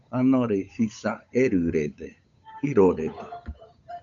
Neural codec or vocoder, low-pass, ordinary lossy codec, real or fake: codec, 16 kHz, 8 kbps, FunCodec, trained on Chinese and English, 25 frames a second; 7.2 kHz; MP3, 96 kbps; fake